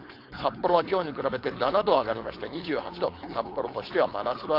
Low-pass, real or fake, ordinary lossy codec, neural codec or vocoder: 5.4 kHz; fake; AAC, 32 kbps; codec, 16 kHz, 4.8 kbps, FACodec